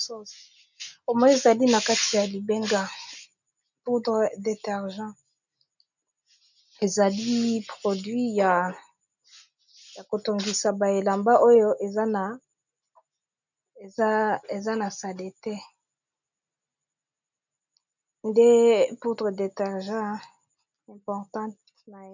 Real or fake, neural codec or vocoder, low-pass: real; none; 7.2 kHz